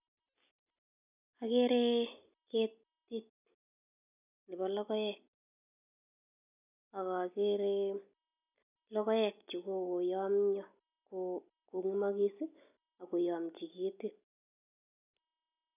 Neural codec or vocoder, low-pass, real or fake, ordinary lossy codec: none; 3.6 kHz; real; none